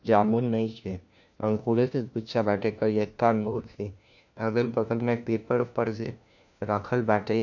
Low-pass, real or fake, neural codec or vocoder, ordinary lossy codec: 7.2 kHz; fake; codec, 16 kHz, 1 kbps, FunCodec, trained on LibriTTS, 50 frames a second; none